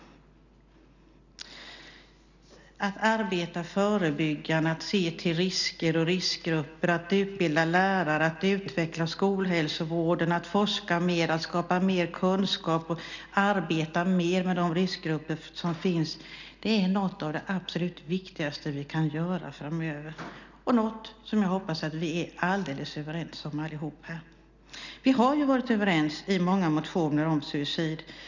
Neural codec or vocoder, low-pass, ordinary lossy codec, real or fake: none; 7.2 kHz; none; real